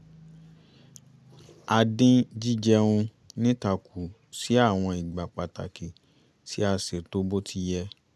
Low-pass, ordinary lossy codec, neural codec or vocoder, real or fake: none; none; none; real